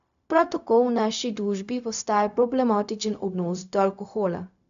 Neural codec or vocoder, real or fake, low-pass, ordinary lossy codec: codec, 16 kHz, 0.4 kbps, LongCat-Audio-Codec; fake; 7.2 kHz; AAC, 96 kbps